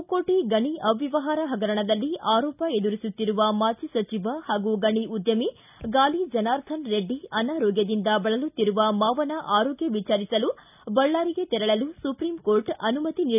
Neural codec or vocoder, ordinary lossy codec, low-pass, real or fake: none; none; 3.6 kHz; real